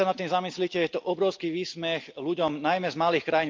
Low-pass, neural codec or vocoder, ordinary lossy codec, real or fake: 7.2 kHz; none; Opus, 24 kbps; real